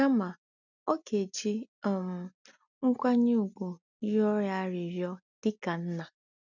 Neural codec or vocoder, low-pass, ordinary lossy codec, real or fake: none; 7.2 kHz; none; real